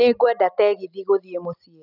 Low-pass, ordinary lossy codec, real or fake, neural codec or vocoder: 5.4 kHz; none; real; none